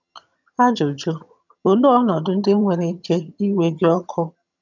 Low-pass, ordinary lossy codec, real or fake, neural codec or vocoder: 7.2 kHz; none; fake; vocoder, 22.05 kHz, 80 mel bands, HiFi-GAN